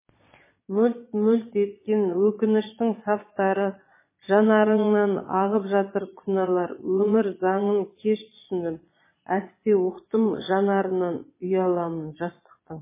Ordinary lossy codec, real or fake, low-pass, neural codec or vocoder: MP3, 16 kbps; fake; 3.6 kHz; vocoder, 22.05 kHz, 80 mel bands, Vocos